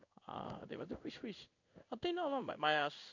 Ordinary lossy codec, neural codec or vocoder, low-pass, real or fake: none; codec, 16 kHz in and 24 kHz out, 1 kbps, XY-Tokenizer; 7.2 kHz; fake